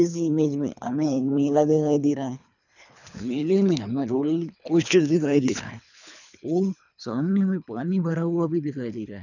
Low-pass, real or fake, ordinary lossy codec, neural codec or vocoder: 7.2 kHz; fake; none; codec, 24 kHz, 3 kbps, HILCodec